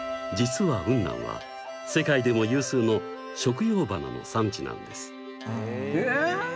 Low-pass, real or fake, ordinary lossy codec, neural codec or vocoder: none; real; none; none